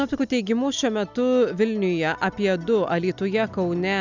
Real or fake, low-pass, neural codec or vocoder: real; 7.2 kHz; none